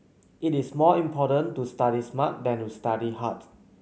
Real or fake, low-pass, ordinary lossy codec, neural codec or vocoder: real; none; none; none